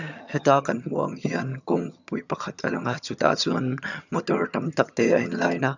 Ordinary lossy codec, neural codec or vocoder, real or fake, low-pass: none; vocoder, 22.05 kHz, 80 mel bands, HiFi-GAN; fake; 7.2 kHz